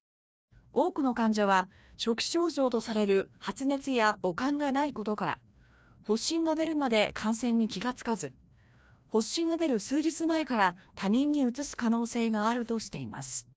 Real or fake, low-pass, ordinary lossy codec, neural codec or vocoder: fake; none; none; codec, 16 kHz, 1 kbps, FreqCodec, larger model